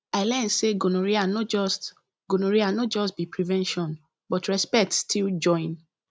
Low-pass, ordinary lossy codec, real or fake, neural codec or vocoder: none; none; real; none